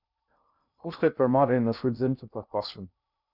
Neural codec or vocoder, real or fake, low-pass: codec, 16 kHz in and 24 kHz out, 0.6 kbps, FocalCodec, streaming, 2048 codes; fake; 5.4 kHz